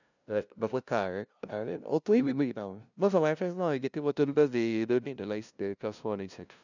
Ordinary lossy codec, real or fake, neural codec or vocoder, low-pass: none; fake; codec, 16 kHz, 0.5 kbps, FunCodec, trained on LibriTTS, 25 frames a second; 7.2 kHz